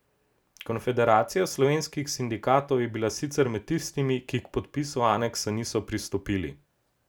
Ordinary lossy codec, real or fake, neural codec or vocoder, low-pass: none; real; none; none